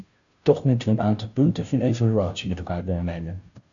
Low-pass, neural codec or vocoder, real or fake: 7.2 kHz; codec, 16 kHz, 0.5 kbps, FunCodec, trained on Chinese and English, 25 frames a second; fake